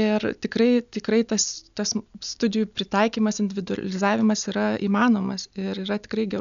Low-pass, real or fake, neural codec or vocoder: 7.2 kHz; real; none